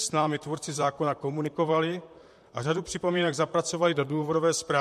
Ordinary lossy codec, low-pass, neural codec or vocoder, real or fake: MP3, 64 kbps; 14.4 kHz; vocoder, 44.1 kHz, 128 mel bands, Pupu-Vocoder; fake